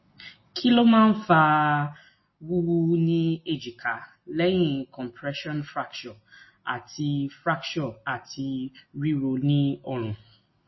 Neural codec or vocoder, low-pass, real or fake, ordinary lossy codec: none; 7.2 kHz; real; MP3, 24 kbps